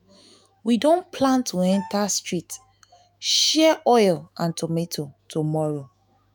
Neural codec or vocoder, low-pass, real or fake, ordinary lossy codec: autoencoder, 48 kHz, 128 numbers a frame, DAC-VAE, trained on Japanese speech; none; fake; none